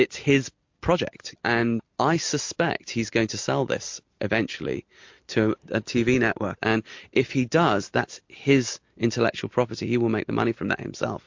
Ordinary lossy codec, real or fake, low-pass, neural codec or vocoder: MP3, 48 kbps; real; 7.2 kHz; none